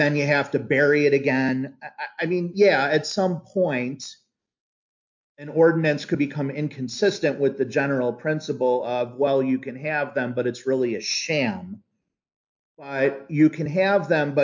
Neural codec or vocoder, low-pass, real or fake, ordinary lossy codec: none; 7.2 kHz; real; MP3, 48 kbps